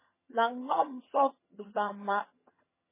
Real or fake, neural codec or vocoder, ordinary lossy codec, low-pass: fake; vocoder, 22.05 kHz, 80 mel bands, HiFi-GAN; MP3, 16 kbps; 3.6 kHz